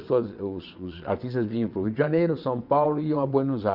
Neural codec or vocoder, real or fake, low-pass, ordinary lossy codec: vocoder, 22.05 kHz, 80 mel bands, WaveNeXt; fake; 5.4 kHz; none